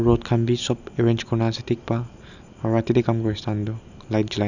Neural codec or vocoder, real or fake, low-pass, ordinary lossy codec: none; real; 7.2 kHz; none